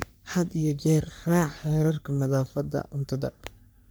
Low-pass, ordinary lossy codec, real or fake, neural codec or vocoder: none; none; fake; codec, 44.1 kHz, 3.4 kbps, Pupu-Codec